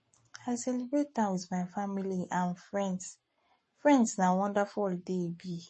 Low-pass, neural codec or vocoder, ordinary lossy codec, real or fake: 10.8 kHz; codec, 44.1 kHz, 7.8 kbps, Pupu-Codec; MP3, 32 kbps; fake